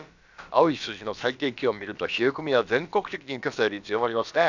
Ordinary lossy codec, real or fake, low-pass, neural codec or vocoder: none; fake; 7.2 kHz; codec, 16 kHz, about 1 kbps, DyCAST, with the encoder's durations